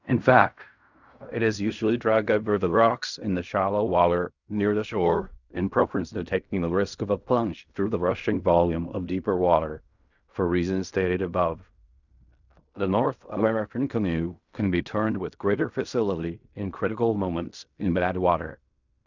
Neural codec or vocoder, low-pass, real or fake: codec, 16 kHz in and 24 kHz out, 0.4 kbps, LongCat-Audio-Codec, fine tuned four codebook decoder; 7.2 kHz; fake